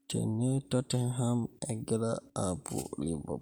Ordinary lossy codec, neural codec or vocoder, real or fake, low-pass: none; none; real; none